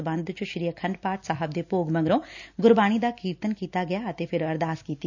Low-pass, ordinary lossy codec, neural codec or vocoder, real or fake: 7.2 kHz; none; none; real